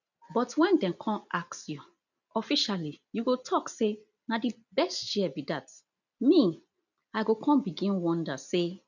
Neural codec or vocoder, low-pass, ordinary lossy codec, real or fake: none; 7.2 kHz; none; real